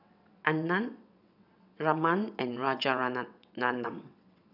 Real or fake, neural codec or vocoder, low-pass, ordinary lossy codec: fake; vocoder, 22.05 kHz, 80 mel bands, WaveNeXt; 5.4 kHz; none